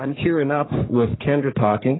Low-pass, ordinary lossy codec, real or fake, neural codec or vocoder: 7.2 kHz; AAC, 16 kbps; fake; codec, 44.1 kHz, 3.4 kbps, Pupu-Codec